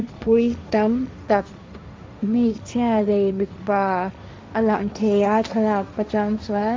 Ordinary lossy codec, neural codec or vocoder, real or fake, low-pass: none; codec, 16 kHz, 1.1 kbps, Voila-Tokenizer; fake; none